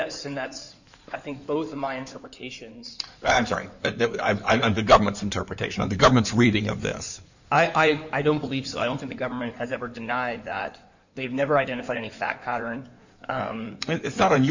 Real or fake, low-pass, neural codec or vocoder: fake; 7.2 kHz; codec, 16 kHz in and 24 kHz out, 2.2 kbps, FireRedTTS-2 codec